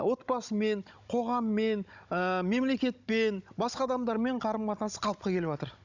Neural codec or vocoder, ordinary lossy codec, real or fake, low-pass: codec, 16 kHz, 16 kbps, FunCodec, trained on Chinese and English, 50 frames a second; none; fake; 7.2 kHz